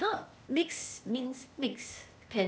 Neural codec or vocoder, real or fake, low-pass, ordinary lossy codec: codec, 16 kHz, 0.8 kbps, ZipCodec; fake; none; none